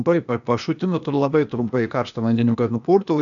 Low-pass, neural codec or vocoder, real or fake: 7.2 kHz; codec, 16 kHz, 0.8 kbps, ZipCodec; fake